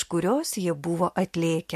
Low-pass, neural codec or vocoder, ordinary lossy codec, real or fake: 14.4 kHz; none; MP3, 64 kbps; real